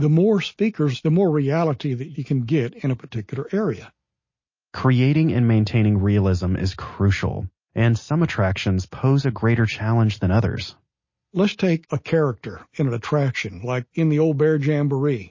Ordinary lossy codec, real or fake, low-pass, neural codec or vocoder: MP3, 32 kbps; real; 7.2 kHz; none